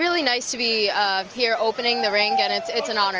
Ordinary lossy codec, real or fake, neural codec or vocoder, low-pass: Opus, 24 kbps; real; none; 7.2 kHz